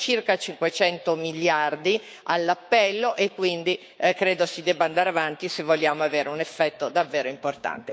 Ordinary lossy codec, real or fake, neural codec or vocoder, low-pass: none; fake; codec, 16 kHz, 6 kbps, DAC; none